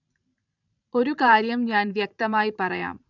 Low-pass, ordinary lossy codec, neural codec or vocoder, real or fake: 7.2 kHz; none; vocoder, 44.1 kHz, 128 mel bands every 512 samples, BigVGAN v2; fake